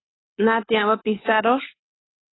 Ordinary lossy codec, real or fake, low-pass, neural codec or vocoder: AAC, 16 kbps; fake; 7.2 kHz; codec, 24 kHz, 6 kbps, HILCodec